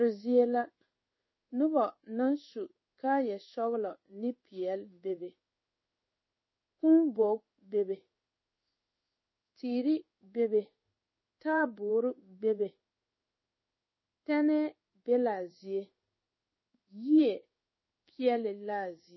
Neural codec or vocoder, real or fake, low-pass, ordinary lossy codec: codec, 16 kHz in and 24 kHz out, 1 kbps, XY-Tokenizer; fake; 7.2 kHz; MP3, 24 kbps